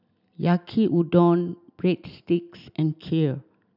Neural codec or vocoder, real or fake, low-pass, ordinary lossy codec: none; real; 5.4 kHz; none